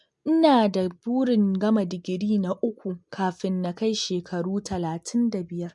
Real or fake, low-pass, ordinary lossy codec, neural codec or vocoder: real; 10.8 kHz; MP3, 64 kbps; none